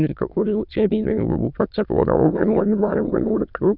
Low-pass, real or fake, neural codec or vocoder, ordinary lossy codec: 5.4 kHz; fake; autoencoder, 22.05 kHz, a latent of 192 numbers a frame, VITS, trained on many speakers; none